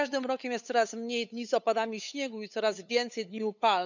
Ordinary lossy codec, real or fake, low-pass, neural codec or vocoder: none; fake; 7.2 kHz; codec, 16 kHz, 8 kbps, FunCodec, trained on LibriTTS, 25 frames a second